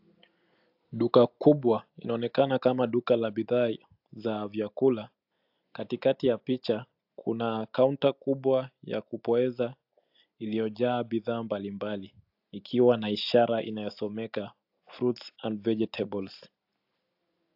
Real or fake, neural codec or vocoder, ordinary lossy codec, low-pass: real; none; AAC, 48 kbps; 5.4 kHz